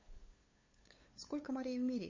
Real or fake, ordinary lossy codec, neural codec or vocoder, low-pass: fake; MP3, 32 kbps; codec, 16 kHz, 8 kbps, FunCodec, trained on LibriTTS, 25 frames a second; 7.2 kHz